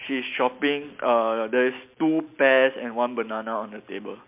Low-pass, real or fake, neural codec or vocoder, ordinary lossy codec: 3.6 kHz; real; none; MP3, 32 kbps